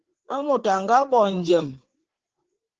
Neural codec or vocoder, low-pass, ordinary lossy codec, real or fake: codec, 16 kHz, 4 kbps, FreqCodec, larger model; 7.2 kHz; Opus, 16 kbps; fake